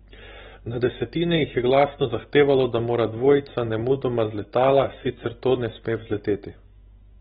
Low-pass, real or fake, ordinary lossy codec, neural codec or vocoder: 10.8 kHz; real; AAC, 16 kbps; none